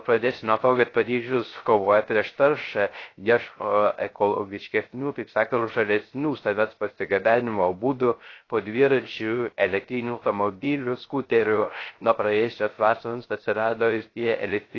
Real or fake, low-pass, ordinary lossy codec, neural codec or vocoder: fake; 7.2 kHz; AAC, 32 kbps; codec, 16 kHz, 0.3 kbps, FocalCodec